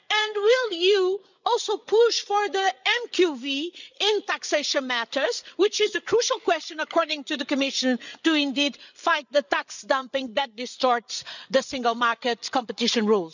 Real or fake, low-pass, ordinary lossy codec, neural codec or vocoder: fake; 7.2 kHz; none; codec, 16 kHz, 8 kbps, FreqCodec, larger model